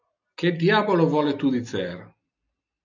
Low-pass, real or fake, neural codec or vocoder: 7.2 kHz; real; none